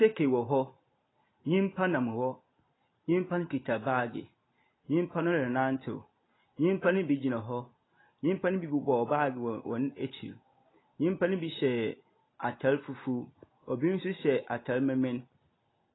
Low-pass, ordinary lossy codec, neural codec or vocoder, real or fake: 7.2 kHz; AAC, 16 kbps; none; real